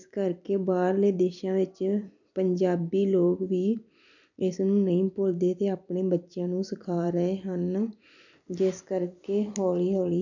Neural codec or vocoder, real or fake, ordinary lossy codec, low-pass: vocoder, 44.1 kHz, 128 mel bands every 256 samples, BigVGAN v2; fake; none; 7.2 kHz